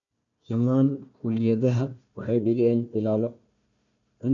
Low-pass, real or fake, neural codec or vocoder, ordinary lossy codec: 7.2 kHz; fake; codec, 16 kHz, 1 kbps, FunCodec, trained on Chinese and English, 50 frames a second; none